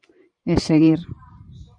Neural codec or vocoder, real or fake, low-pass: vocoder, 44.1 kHz, 128 mel bands every 512 samples, BigVGAN v2; fake; 9.9 kHz